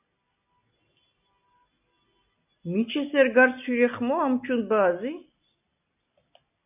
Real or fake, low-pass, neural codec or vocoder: real; 3.6 kHz; none